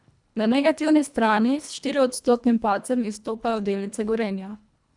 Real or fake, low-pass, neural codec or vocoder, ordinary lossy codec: fake; 10.8 kHz; codec, 24 kHz, 1.5 kbps, HILCodec; none